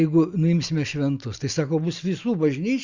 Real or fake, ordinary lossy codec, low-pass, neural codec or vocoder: real; Opus, 64 kbps; 7.2 kHz; none